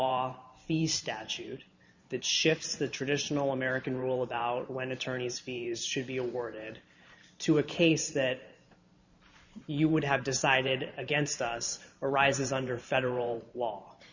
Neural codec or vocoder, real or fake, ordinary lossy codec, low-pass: vocoder, 44.1 kHz, 128 mel bands every 512 samples, BigVGAN v2; fake; Opus, 64 kbps; 7.2 kHz